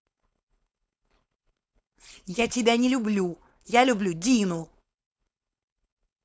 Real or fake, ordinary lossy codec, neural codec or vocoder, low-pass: fake; none; codec, 16 kHz, 4.8 kbps, FACodec; none